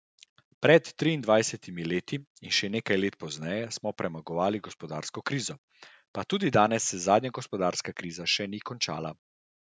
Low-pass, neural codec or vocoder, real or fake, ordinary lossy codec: none; none; real; none